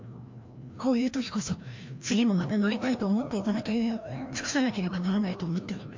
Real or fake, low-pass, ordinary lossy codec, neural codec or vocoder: fake; 7.2 kHz; MP3, 48 kbps; codec, 16 kHz, 1 kbps, FreqCodec, larger model